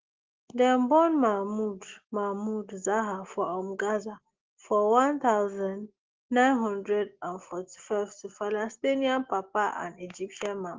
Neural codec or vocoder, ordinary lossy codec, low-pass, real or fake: none; Opus, 16 kbps; 7.2 kHz; real